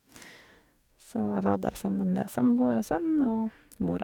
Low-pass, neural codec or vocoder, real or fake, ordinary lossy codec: 19.8 kHz; codec, 44.1 kHz, 2.6 kbps, DAC; fake; none